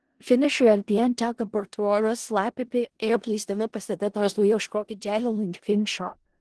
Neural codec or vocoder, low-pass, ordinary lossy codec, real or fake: codec, 16 kHz in and 24 kHz out, 0.4 kbps, LongCat-Audio-Codec, four codebook decoder; 10.8 kHz; Opus, 16 kbps; fake